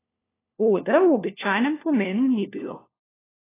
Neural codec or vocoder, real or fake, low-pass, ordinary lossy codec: codec, 16 kHz, 1 kbps, FunCodec, trained on LibriTTS, 50 frames a second; fake; 3.6 kHz; AAC, 16 kbps